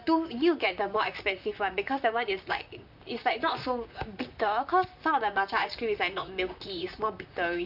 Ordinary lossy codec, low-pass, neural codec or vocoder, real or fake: none; 5.4 kHz; vocoder, 44.1 kHz, 128 mel bands, Pupu-Vocoder; fake